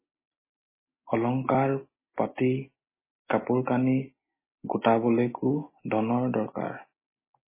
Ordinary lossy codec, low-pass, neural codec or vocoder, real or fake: MP3, 16 kbps; 3.6 kHz; none; real